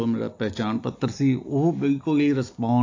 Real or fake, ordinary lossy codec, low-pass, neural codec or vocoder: real; AAC, 48 kbps; 7.2 kHz; none